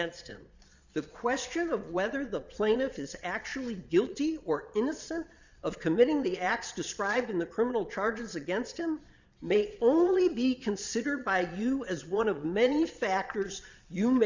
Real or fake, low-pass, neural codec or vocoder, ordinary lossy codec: fake; 7.2 kHz; vocoder, 22.05 kHz, 80 mel bands, Vocos; Opus, 64 kbps